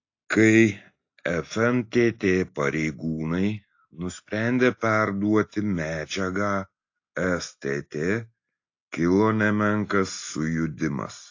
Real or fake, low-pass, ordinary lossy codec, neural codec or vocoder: real; 7.2 kHz; AAC, 48 kbps; none